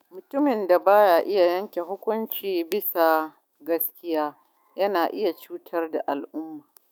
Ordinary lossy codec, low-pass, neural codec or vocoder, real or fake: none; none; autoencoder, 48 kHz, 128 numbers a frame, DAC-VAE, trained on Japanese speech; fake